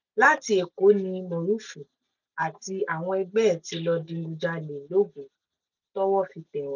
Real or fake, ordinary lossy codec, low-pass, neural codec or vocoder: real; none; 7.2 kHz; none